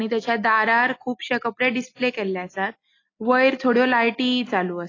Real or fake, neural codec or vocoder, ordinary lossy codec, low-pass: real; none; AAC, 32 kbps; 7.2 kHz